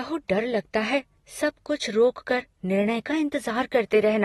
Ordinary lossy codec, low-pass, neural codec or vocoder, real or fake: AAC, 32 kbps; 19.8 kHz; none; real